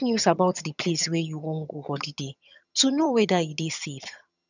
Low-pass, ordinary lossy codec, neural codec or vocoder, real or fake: 7.2 kHz; none; vocoder, 22.05 kHz, 80 mel bands, HiFi-GAN; fake